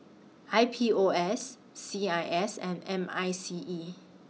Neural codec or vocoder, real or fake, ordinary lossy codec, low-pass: none; real; none; none